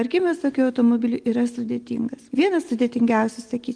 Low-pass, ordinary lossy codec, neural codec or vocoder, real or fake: 9.9 kHz; Opus, 24 kbps; none; real